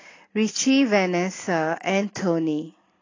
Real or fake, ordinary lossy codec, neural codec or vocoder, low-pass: real; AAC, 32 kbps; none; 7.2 kHz